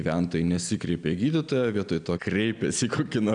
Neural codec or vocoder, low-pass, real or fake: none; 9.9 kHz; real